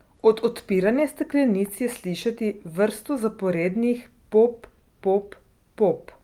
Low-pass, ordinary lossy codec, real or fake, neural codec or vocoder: 19.8 kHz; Opus, 32 kbps; real; none